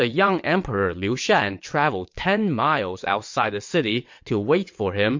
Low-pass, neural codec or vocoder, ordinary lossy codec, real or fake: 7.2 kHz; vocoder, 22.05 kHz, 80 mel bands, WaveNeXt; MP3, 48 kbps; fake